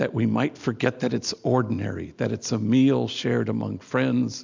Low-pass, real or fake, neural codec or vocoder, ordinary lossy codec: 7.2 kHz; real; none; MP3, 64 kbps